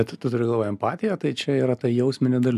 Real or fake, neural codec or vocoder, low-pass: real; none; 14.4 kHz